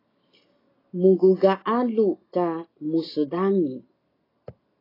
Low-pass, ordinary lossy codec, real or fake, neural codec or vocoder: 5.4 kHz; AAC, 24 kbps; real; none